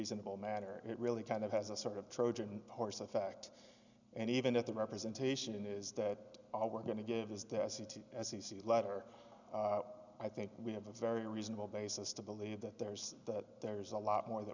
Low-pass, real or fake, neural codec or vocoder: 7.2 kHz; real; none